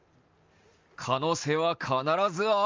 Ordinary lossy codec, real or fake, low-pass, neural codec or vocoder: Opus, 32 kbps; real; 7.2 kHz; none